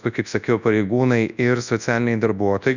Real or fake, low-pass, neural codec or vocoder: fake; 7.2 kHz; codec, 24 kHz, 0.9 kbps, WavTokenizer, large speech release